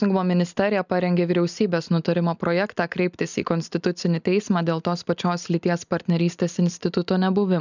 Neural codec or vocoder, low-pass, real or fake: none; 7.2 kHz; real